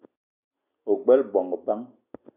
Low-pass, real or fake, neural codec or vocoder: 3.6 kHz; real; none